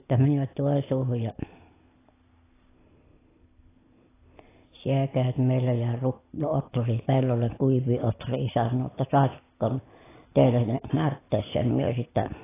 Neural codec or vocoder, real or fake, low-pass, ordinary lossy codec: none; real; 3.6 kHz; AAC, 16 kbps